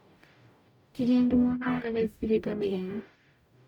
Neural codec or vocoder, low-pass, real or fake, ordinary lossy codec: codec, 44.1 kHz, 0.9 kbps, DAC; 19.8 kHz; fake; none